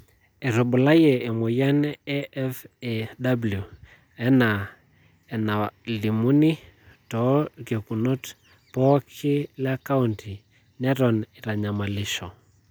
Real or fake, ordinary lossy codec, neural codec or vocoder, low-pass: real; none; none; none